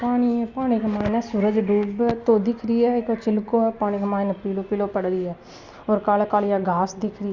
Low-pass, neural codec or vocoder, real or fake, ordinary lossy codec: 7.2 kHz; none; real; Opus, 64 kbps